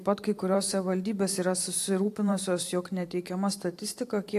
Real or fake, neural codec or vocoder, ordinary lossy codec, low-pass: fake; vocoder, 44.1 kHz, 128 mel bands every 512 samples, BigVGAN v2; AAC, 64 kbps; 14.4 kHz